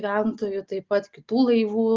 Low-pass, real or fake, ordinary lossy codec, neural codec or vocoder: 7.2 kHz; real; Opus, 24 kbps; none